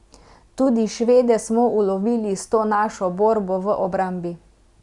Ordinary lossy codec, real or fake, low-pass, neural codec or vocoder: Opus, 64 kbps; real; 10.8 kHz; none